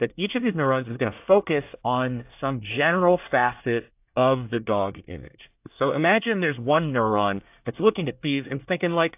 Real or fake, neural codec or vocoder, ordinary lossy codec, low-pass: fake; codec, 24 kHz, 1 kbps, SNAC; AAC, 32 kbps; 3.6 kHz